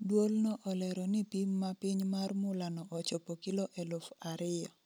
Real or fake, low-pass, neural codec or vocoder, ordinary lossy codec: real; none; none; none